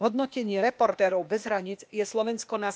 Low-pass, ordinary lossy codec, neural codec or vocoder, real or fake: none; none; codec, 16 kHz, 0.8 kbps, ZipCodec; fake